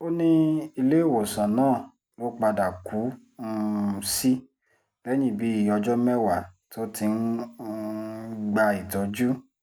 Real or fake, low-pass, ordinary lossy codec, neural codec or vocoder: real; none; none; none